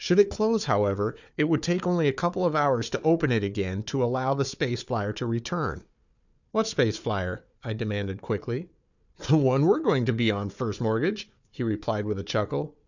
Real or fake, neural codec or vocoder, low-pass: fake; codec, 16 kHz, 4 kbps, FunCodec, trained on Chinese and English, 50 frames a second; 7.2 kHz